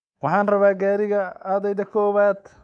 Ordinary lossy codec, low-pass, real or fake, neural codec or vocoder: none; 9.9 kHz; fake; codec, 24 kHz, 3.1 kbps, DualCodec